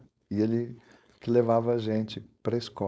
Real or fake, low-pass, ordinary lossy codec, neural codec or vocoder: fake; none; none; codec, 16 kHz, 4.8 kbps, FACodec